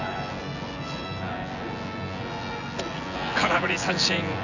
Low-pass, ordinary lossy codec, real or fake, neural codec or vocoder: 7.2 kHz; none; fake; vocoder, 24 kHz, 100 mel bands, Vocos